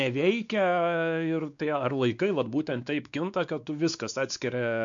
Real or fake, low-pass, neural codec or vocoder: fake; 7.2 kHz; codec, 16 kHz, 2 kbps, X-Codec, WavLM features, trained on Multilingual LibriSpeech